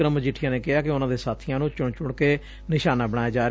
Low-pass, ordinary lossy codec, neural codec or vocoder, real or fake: none; none; none; real